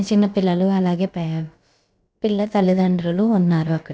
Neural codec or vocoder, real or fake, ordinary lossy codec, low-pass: codec, 16 kHz, about 1 kbps, DyCAST, with the encoder's durations; fake; none; none